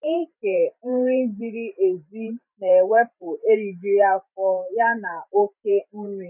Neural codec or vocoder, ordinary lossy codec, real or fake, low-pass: vocoder, 44.1 kHz, 128 mel bands every 512 samples, BigVGAN v2; none; fake; 3.6 kHz